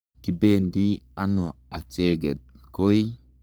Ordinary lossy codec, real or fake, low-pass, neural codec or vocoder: none; fake; none; codec, 44.1 kHz, 3.4 kbps, Pupu-Codec